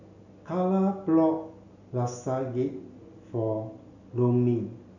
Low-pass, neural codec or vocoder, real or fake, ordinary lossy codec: 7.2 kHz; none; real; none